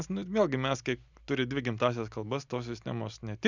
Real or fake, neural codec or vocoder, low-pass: real; none; 7.2 kHz